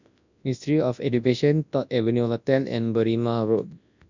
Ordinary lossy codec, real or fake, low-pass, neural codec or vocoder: none; fake; 7.2 kHz; codec, 24 kHz, 0.9 kbps, WavTokenizer, large speech release